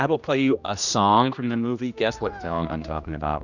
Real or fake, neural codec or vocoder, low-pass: fake; codec, 16 kHz, 1 kbps, X-Codec, HuBERT features, trained on general audio; 7.2 kHz